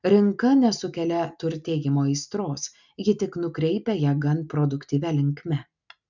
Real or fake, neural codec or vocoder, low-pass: real; none; 7.2 kHz